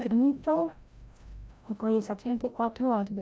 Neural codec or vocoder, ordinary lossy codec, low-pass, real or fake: codec, 16 kHz, 0.5 kbps, FreqCodec, larger model; none; none; fake